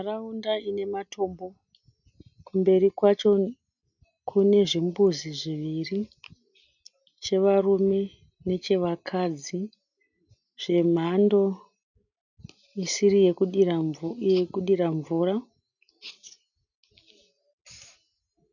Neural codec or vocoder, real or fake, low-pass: none; real; 7.2 kHz